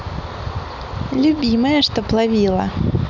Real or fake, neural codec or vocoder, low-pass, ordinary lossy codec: real; none; 7.2 kHz; none